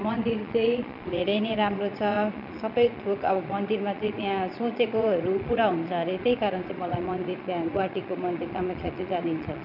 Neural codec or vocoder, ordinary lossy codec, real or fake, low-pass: vocoder, 22.05 kHz, 80 mel bands, Vocos; none; fake; 5.4 kHz